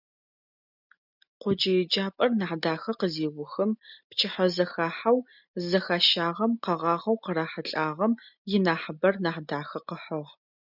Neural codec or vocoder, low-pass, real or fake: none; 5.4 kHz; real